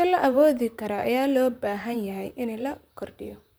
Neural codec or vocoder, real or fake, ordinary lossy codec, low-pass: vocoder, 44.1 kHz, 128 mel bands, Pupu-Vocoder; fake; none; none